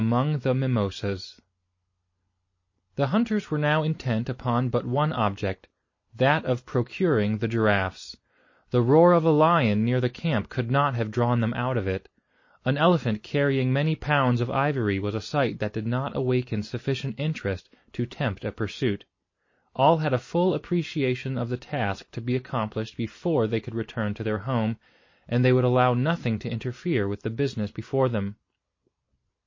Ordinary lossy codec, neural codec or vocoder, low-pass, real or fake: MP3, 32 kbps; none; 7.2 kHz; real